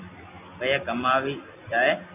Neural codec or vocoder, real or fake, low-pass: none; real; 3.6 kHz